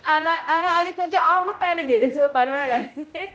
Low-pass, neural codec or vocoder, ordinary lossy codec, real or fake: none; codec, 16 kHz, 0.5 kbps, X-Codec, HuBERT features, trained on general audio; none; fake